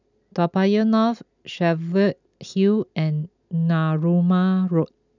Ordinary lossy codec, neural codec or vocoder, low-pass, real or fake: none; none; 7.2 kHz; real